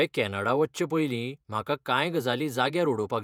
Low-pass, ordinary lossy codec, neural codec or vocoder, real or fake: 19.8 kHz; none; vocoder, 48 kHz, 128 mel bands, Vocos; fake